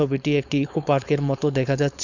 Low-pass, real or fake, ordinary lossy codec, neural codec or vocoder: 7.2 kHz; fake; none; codec, 16 kHz, 4.8 kbps, FACodec